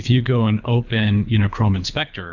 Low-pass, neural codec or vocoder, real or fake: 7.2 kHz; codec, 24 kHz, 3 kbps, HILCodec; fake